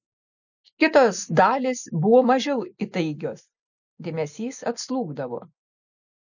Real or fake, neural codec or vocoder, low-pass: real; none; 7.2 kHz